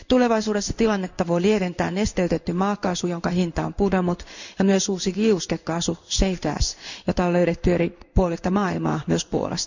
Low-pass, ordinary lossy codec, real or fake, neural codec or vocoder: 7.2 kHz; none; fake; codec, 16 kHz in and 24 kHz out, 1 kbps, XY-Tokenizer